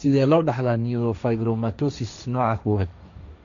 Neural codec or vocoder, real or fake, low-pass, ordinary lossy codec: codec, 16 kHz, 1.1 kbps, Voila-Tokenizer; fake; 7.2 kHz; none